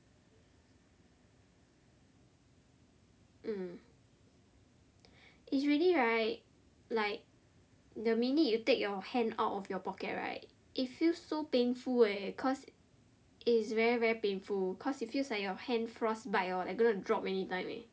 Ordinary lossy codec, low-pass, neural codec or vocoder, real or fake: none; none; none; real